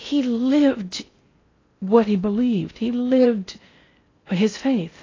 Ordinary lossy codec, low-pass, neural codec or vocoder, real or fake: AAC, 32 kbps; 7.2 kHz; codec, 16 kHz in and 24 kHz out, 0.6 kbps, FocalCodec, streaming, 4096 codes; fake